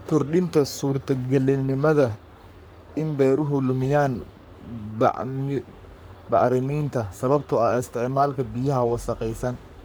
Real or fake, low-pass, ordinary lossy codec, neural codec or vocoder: fake; none; none; codec, 44.1 kHz, 3.4 kbps, Pupu-Codec